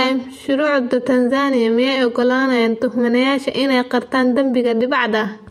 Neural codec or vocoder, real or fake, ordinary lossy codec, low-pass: vocoder, 48 kHz, 128 mel bands, Vocos; fake; MP3, 64 kbps; 19.8 kHz